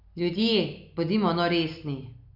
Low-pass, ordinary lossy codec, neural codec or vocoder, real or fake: 5.4 kHz; none; none; real